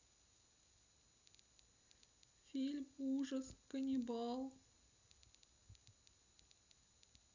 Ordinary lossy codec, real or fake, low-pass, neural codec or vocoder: none; real; 7.2 kHz; none